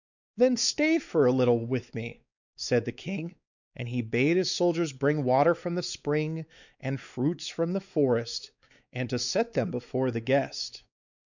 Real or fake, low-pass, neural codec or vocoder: fake; 7.2 kHz; codec, 16 kHz, 4 kbps, X-Codec, WavLM features, trained on Multilingual LibriSpeech